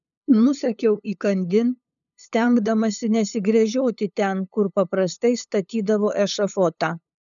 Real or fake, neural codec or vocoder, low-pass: fake; codec, 16 kHz, 8 kbps, FunCodec, trained on LibriTTS, 25 frames a second; 7.2 kHz